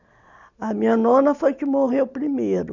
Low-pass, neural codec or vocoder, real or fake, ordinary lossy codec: 7.2 kHz; none; real; none